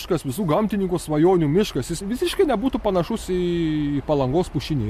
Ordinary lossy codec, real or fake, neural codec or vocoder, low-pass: MP3, 64 kbps; real; none; 14.4 kHz